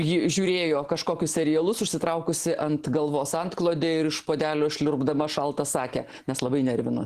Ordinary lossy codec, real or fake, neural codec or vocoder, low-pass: Opus, 16 kbps; real; none; 14.4 kHz